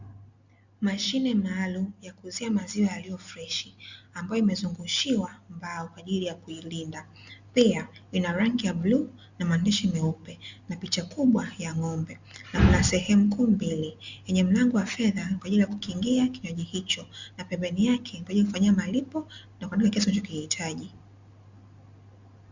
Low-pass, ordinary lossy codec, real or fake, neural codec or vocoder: 7.2 kHz; Opus, 64 kbps; real; none